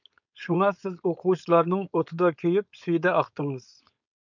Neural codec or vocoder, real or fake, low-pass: codec, 16 kHz, 4.8 kbps, FACodec; fake; 7.2 kHz